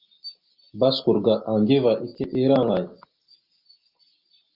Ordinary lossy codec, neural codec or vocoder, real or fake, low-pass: Opus, 24 kbps; none; real; 5.4 kHz